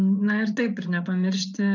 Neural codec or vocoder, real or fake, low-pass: vocoder, 24 kHz, 100 mel bands, Vocos; fake; 7.2 kHz